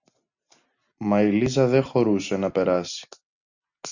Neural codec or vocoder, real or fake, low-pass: none; real; 7.2 kHz